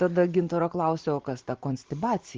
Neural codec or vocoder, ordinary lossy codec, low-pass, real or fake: none; Opus, 16 kbps; 7.2 kHz; real